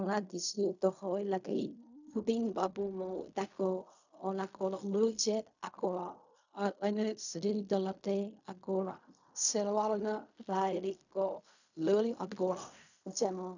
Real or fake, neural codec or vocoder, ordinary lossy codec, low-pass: fake; codec, 16 kHz in and 24 kHz out, 0.4 kbps, LongCat-Audio-Codec, fine tuned four codebook decoder; none; 7.2 kHz